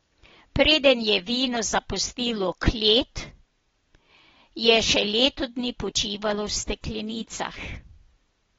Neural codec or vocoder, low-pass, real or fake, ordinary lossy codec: none; 7.2 kHz; real; AAC, 24 kbps